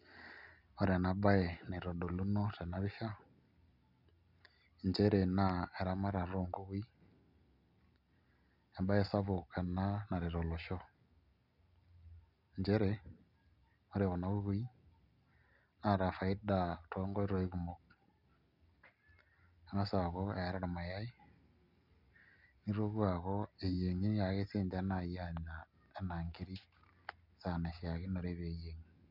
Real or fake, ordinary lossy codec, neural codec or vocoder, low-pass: real; none; none; 5.4 kHz